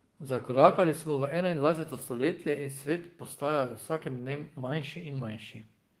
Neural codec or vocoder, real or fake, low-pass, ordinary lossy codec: codec, 32 kHz, 1.9 kbps, SNAC; fake; 14.4 kHz; Opus, 24 kbps